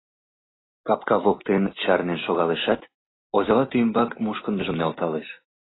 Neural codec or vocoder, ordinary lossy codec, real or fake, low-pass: none; AAC, 16 kbps; real; 7.2 kHz